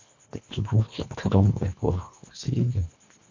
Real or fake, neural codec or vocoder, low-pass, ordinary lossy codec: fake; codec, 24 kHz, 1.5 kbps, HILCodec; 7.2 kHz; MP3, 48 kbps